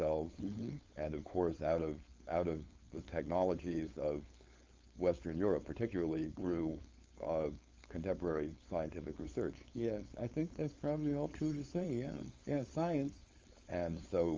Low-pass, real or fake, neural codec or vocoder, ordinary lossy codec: 7.2 kHz; fake; codec, 16 kHz, 4.8 kbps, FACodec; Opus, 32 kbps